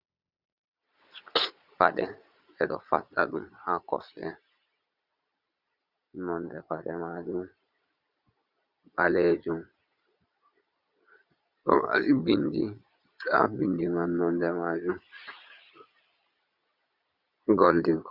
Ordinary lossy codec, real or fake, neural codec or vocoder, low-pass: Opus, 64 kbps; fake; vocoder, 22.05 kHz, 80 mel bands, Vocos; 5.4 kHz